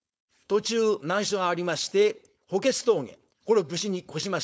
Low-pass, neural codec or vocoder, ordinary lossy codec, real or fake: none; codec, 16 kHz, 4.8 kbps, FACodec; none; fake